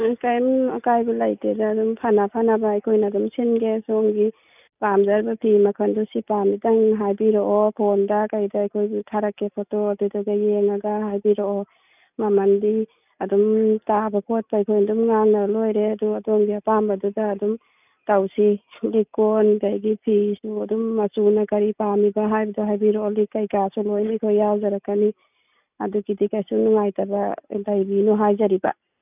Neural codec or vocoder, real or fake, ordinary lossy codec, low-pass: none; real; none; 3.6 kHz